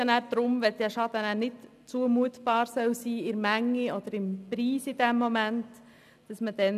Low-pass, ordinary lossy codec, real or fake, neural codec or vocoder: 14.4 kHz; none; real; none